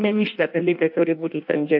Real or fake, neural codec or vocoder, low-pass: fake; codec, 16 kHz in and 24 kHz out, 0.6 kbps, FireRedTTS-2 codec; 5.4 kHz